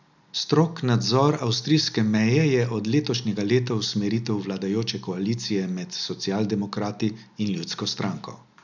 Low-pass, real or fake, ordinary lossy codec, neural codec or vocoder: 7.2 kHz; real; none; none